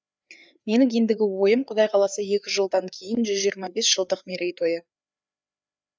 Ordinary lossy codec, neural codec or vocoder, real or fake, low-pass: none; codec, 16 kHz, 4 kbps, FreqCodec, larger model; fake; none